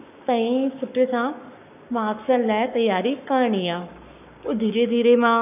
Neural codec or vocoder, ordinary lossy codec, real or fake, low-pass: codec, 44.1 kHz, 7.8 kbps, Pupu-Codec; none; fake; 3.6 kHz